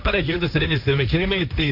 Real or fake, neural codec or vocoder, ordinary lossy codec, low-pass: fake; codec, 16 kHz, 1.1 kbps, Voila-Tokenizer; none; 5.4 kHz